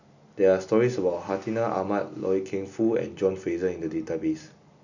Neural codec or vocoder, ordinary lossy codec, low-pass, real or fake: none; none; 7.2 kHz; real